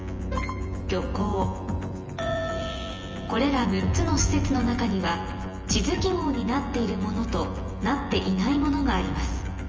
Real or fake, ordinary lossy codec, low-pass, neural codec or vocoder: fake; Opus, 24 kbps; 7.2 kHz; vocoder, 24 kHz, 100 mel bands, Vocos